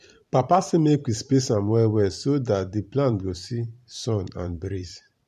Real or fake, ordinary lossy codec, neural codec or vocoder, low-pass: fake; MP3, 64 kbps; vocoder, 44.1 kHz, 128 mel bands every 512 samples, BigVGAN v2; 14.4 kHz